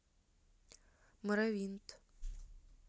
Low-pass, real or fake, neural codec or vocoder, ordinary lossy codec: none; real; none; none